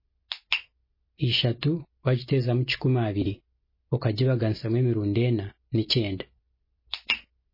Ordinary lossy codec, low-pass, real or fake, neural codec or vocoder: MP3, 24 kbps; 5.4 kHz; real; none